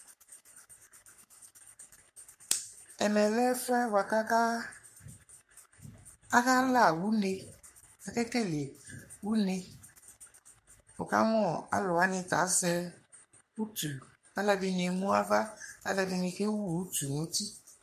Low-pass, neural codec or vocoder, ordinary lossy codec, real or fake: 14.4 kHz; codec, 44.1 kHz, 3.4 kbps, Pupu-Codec; MP3, 64 kbps; fake